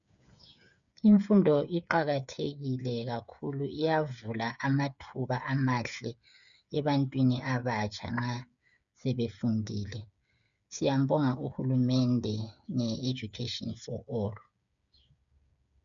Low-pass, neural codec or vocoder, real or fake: 7.2 kHz; codec, 16 kHz, 8 kbps, FreqCodec, smaller model; fake